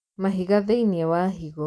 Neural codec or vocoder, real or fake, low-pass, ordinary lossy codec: none; real; none; none